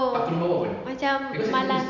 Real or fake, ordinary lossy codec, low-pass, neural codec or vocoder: real; none; 7.2 kHz; none